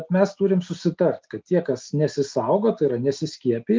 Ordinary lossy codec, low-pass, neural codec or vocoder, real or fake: Opus, 24 kbps; 7.2 kHz; none; real